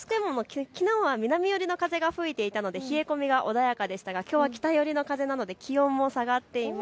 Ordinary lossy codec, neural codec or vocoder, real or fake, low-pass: none; none; real; none